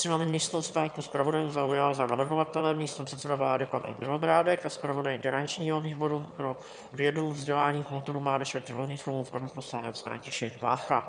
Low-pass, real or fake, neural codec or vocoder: 9.9 kHz; fake; autoencoder, 22.05 kHz, a latent of 192 numbers a frame, VITS, trained on one speaker